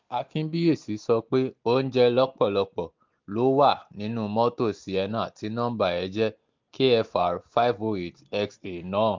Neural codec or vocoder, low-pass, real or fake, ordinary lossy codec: none; 7.2 kHz; real; MP3, 64 kbps